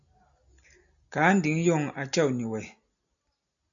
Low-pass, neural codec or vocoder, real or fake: 7.2 kHz; none; real